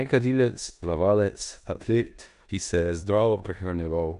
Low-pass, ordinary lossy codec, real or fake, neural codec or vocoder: 10.8 kHz; none; fake; codec, 16 kHz in and 24 kHz out, 0.9 kbps, LongCat-Audio-Codec, four codebook decoder